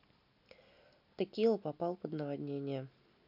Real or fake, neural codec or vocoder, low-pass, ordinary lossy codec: real; none; 5.4 kHz; none